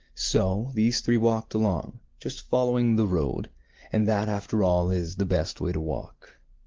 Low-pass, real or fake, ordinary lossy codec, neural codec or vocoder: 7.2 kHz; real; Opus, 16 kbps; none